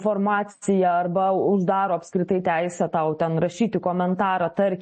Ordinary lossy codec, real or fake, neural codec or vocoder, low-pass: MP3, 32 kbps; real; none; 10.8 kHz